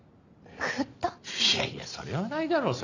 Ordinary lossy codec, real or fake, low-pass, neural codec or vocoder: none; real; 7.2 kHz; none